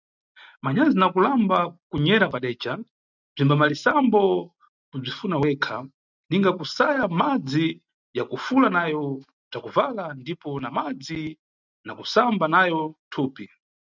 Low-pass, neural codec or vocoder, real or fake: 7.2 kHz; none; real